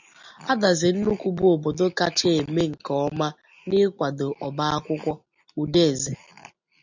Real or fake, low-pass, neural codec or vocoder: real; 7.2 kHz; none